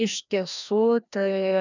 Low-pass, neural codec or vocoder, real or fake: 7.2 kHz; codec, 16 kHz, 1 kbps, FreqCodec, larger model; fake